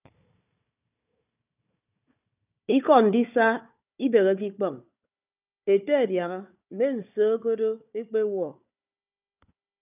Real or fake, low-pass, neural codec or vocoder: fake; 3.6 kHz; codec, 16 kHz, 4 kbps, FunCodec, trained on Chinese and English, 50 frames a second